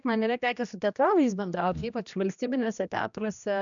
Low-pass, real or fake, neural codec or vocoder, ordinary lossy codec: 7.2 kHz; fake; codec, 16 kHz, 1 kbps, X-Codec, HuBERT features, trained on general audio; MP3, 96 kbps